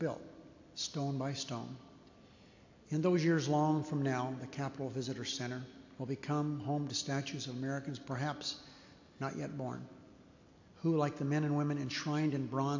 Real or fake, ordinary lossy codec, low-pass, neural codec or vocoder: real; AAC, 48 kbps; 7.2 kHz; none